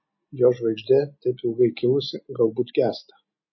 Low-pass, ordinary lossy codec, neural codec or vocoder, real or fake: 7.2 kHz; MP3, 24 kbps; none; real